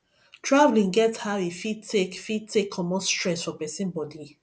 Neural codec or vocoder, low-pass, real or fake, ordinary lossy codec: none; none; real; none